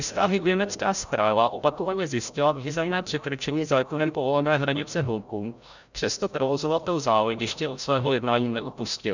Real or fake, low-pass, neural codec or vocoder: fake; 7.2 kHz; codec, 16 kHz, 0.5 kbps, FreqCodec, larger model